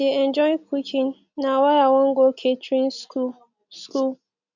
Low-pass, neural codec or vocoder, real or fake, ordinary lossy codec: 7.2 kHz; none; real; none